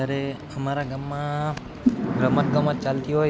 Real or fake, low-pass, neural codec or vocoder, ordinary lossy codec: real; none; none; none